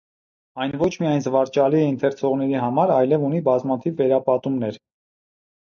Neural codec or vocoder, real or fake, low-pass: none; real; 7.2 kHz